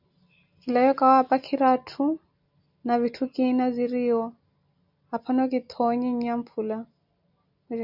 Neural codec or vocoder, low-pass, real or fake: none; 5.4 kHz; real